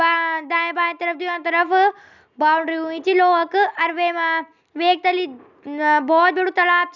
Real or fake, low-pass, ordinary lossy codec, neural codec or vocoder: real; 7.2 kHz; none; none